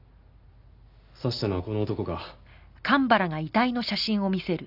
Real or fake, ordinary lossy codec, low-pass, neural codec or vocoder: real; none; 5.4 kHz; none